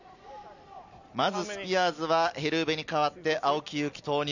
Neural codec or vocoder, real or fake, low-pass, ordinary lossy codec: none; real; 7.2 kHz; none